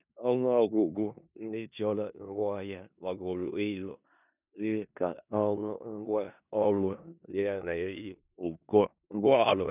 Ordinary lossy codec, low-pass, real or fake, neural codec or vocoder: none; 3.6 kHz; fake; codec, 16 kHz in and 24 kHz out, 0.4 kbps, LongCat-Audio-Codec, four codebook decoder